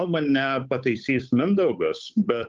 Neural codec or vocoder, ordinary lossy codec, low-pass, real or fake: codec, 16 kHz, 8 kbps, FunCodec, trained on Chinese and English, 25 frames a second; Opus, 32 kbps; 7.2 kHz; fake